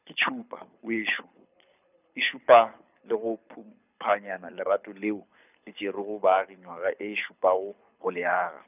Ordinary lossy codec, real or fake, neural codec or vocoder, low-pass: none; fake; codec, 24 kHz, 6 kbps, HILCodec; 3.6 kHz